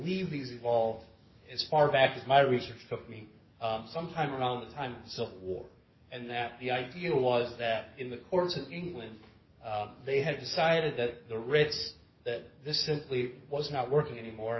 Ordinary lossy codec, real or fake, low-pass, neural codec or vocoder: MP3, 24 kbps; fake; 7.2 kHz; codec, 44.1 kHz, 7.8 kbps, DAC